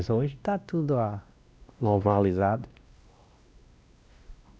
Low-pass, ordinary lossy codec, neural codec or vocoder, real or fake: none; none; codec, 16 kHz, 1 kbps, X-Codec, WavLM features, trained on Multilingual LibriSpeech; fake